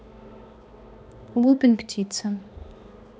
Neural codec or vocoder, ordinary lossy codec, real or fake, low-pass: codec, 16 kHz, 2 kbps, X-Codec, HuBERT features, trained on balanced general audio; none; fake; none